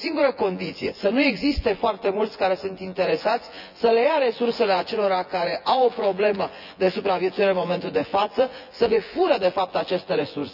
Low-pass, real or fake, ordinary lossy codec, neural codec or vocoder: 5.4 kHz; fake; none; vocoder, 24 kHz, 100 mel bands, Vocos